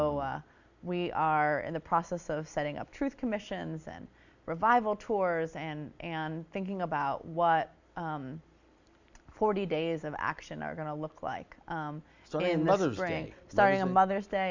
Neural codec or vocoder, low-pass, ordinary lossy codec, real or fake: none; 7.2 kHz; Opus, 64 kbps; real